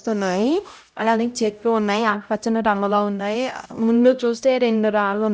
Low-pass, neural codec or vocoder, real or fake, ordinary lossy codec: none; codec, 16 kHz, 0.5 kbps, X-Codec, HuBERT features, trained on LibriSpeech; fake; none